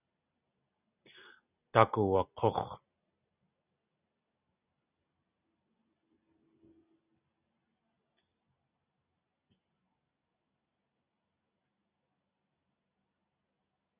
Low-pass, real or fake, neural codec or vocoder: 3.6 kHz; real; none